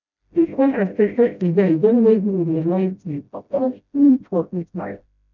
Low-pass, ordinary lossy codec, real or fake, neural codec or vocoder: 7.2 kHz; none; fake; codec, 16 kHz, 0.5 kbps, FreqCodec, smaller model